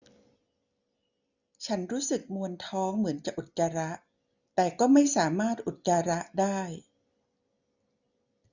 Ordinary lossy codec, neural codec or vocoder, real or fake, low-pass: none; none; real; 7.2 kHz